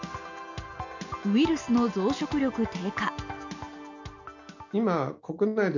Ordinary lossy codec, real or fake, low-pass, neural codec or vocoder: none; real; 7.2 kHz; none